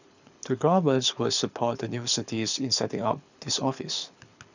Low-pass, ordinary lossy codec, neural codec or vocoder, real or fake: 7.2 kHz; none; codec, 24 kHz, 6 kbps, HILCodec; fake